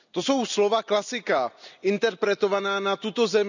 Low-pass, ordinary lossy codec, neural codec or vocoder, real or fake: 7.2 kHz; none; none; real